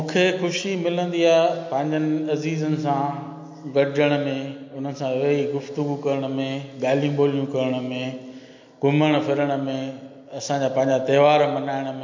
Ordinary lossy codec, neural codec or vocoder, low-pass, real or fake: MP3, 48 kbps; none; 7.2 kHz; real